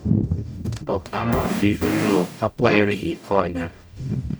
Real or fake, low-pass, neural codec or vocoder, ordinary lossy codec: fake; none; codec, 44.1 kHz, 0.9 kbps, DAC; none